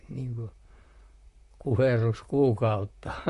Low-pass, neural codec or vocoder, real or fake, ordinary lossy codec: 14.4 kHz; vocoder, 44.1 kHz, 128 mel bands, Pupu-Vocoder; fake; MP3, 48 kbps